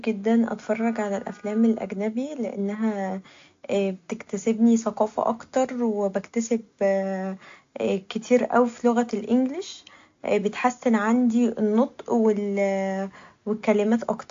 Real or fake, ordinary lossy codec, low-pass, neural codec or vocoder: real; AAC, 48 kbps; 7.2 kHz; none